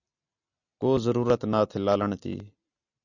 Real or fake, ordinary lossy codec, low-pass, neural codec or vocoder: real; Opus, 64 kbps; 7.2 kHz; none